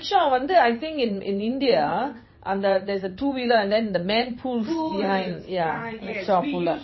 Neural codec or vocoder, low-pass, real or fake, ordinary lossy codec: vocoder, 22.05 kHz, 80 mel bands, WaveNeXt; 7.2 kHz; fake; MP3, 24 kbps